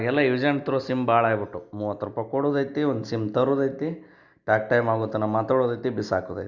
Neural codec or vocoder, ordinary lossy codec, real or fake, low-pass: none; Opus, 64 kbps; real; 7.2 kHz